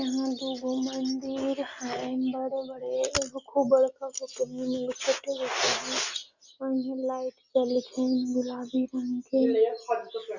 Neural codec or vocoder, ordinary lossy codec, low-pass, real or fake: none; none; 7.2 kHz; real